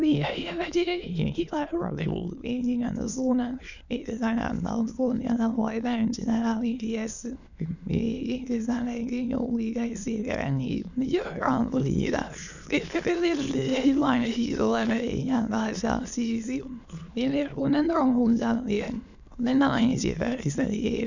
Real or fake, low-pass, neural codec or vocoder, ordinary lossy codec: fake; 7.2 kHz; autoencoder, 22.05 kHz, a latent of 192 numbers a frame, VITS, trained on many speakers; none